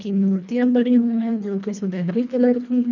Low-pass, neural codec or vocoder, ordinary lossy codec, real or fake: 7.2 kHz; codec, 24 kHz, 1.5 kbps, HILCodec; none; fake